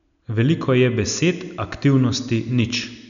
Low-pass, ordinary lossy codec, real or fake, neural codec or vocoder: 7.2 kHz; none; real; none